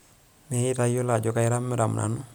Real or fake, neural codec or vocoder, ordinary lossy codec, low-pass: real; none; none; none